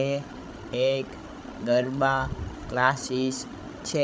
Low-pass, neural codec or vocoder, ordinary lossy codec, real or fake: none; codec, 16 kHz, 16 kbps, FreqCodec, larger model; none; fake